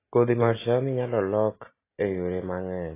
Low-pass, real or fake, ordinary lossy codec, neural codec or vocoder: 3.6 kHz; real; AAC, 24 kbps; none